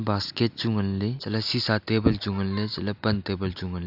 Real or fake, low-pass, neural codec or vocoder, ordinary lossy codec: real; 5.4 kHz; none; AAC, 48 kbps